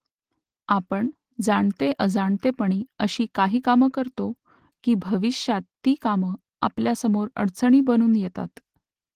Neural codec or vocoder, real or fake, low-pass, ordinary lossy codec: none; real; 14.4 kHz; Opus, 16 kbps